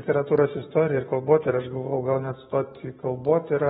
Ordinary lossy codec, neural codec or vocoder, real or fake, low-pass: AAC, 16 kbps; codec, 44.1 kHz, 7.8 kbps, Pupu-Codec; fake; 19.8 kHz